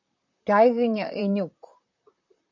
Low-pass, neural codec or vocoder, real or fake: 7.2 kHz; vocoder, 44.1 kHz, 128 mel bands, Pupu-Vocoder; fake